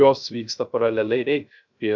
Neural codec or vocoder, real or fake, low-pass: codec, 16 kHz, 0.3 kbps, FocalCodec; fake; 7.2 kHz